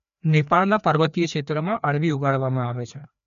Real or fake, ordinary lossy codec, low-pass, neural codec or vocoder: fake; none; 7.2 kHz; codec, 16 kHz, 2 kbps, FreqCodec, larger model